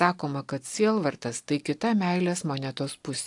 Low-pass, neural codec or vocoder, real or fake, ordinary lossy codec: 10.8 kHz; none; real; AAC, 64 kbps